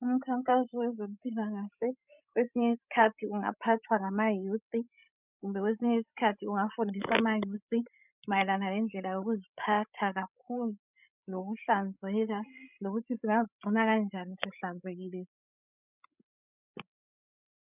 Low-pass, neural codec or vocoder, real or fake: 3.6 kHz; codec, 16 kHz, 16 kbps, FreqCodec, larger model; fake